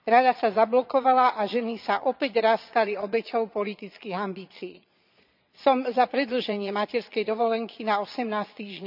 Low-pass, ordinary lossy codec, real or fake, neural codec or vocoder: 5.4 kHz; none; fake; vocoder, 22.05 kHz, 80 mel bands, Vocos